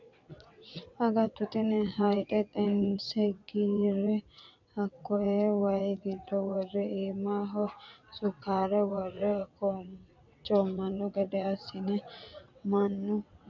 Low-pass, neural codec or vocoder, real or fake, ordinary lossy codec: 7.2 kHz; vocoder, 22.05 kHz, 80 mel bands, WaveNeXt; fake; MP3, 64 kbps